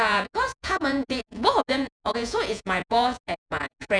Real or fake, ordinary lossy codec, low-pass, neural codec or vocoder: fake; none; 9.9 kHz; vocoder, 48 kHz, 128 mel bands, Vocos